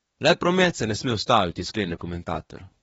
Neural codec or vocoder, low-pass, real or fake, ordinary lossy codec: codec, 24 kHz, 1 kbps, SNAC; 10.8 kHz; fake; AAC, 24 kbps